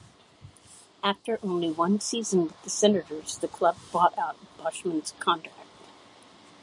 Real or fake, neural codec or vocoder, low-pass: real; none; 10.8 kHz